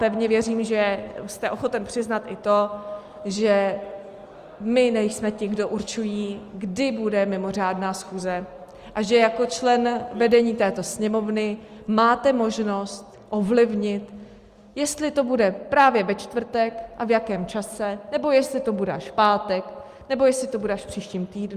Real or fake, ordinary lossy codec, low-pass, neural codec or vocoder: real; Opus, 32 kbps; 14.4 kHz; none